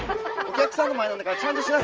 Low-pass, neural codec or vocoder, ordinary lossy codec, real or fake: 7.2 kHz; none; Opus, 24 kbps; real